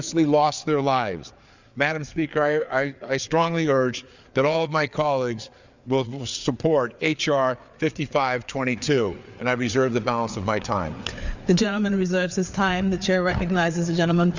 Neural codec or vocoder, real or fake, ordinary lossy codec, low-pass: codec, 16 kHz, 4 kbps, FreqCodec, larger model; fake; Opus, 64 kbps; 7.2 kHz